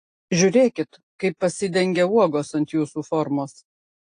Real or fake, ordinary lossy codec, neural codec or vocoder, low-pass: real; AAC, 48 kbps; none; 9.9 kHz